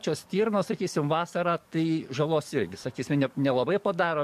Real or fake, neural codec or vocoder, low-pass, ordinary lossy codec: fake; codec, 44.1 kHz, 7.8 kbps, DAC; 14.4 kHz; MP3, 64 kbps